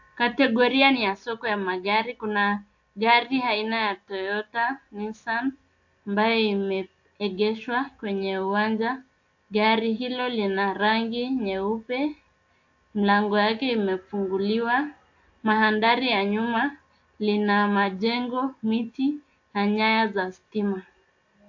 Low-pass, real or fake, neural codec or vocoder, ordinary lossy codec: 7.2 kHz; real; none; AAC, 48 kbps